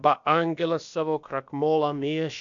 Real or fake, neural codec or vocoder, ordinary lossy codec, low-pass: fake; codec, 16 kHz, about 1 kbps, DyCAST, with the encoder's durations; MP3, 64 kbps; 7.2 kHz